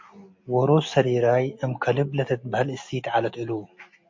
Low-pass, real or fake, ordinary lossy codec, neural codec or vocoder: 7.2 kHz; real; MP3, 48 kbps; none